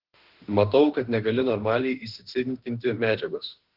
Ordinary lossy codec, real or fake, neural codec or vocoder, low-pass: Opus, 16 kbps; fake; autoencoder, 48 kHz, 32 numbers a frame, DAC-VAE, trained on Japanese speech; 5.4 kHz